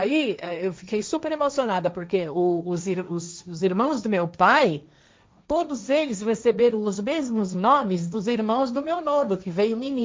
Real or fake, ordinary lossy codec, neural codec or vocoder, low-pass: fake; none; codec, 16 kHz, 1.1 kbps, Voila-Tokenizer; none